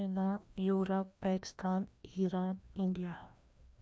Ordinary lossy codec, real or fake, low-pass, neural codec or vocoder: none; fake; none; codec, 16 kHz, 1 kbps, FreqCodec, larger model